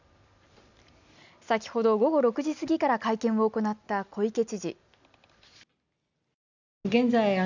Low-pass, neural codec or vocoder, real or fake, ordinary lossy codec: 7.2 kHz; none; real; none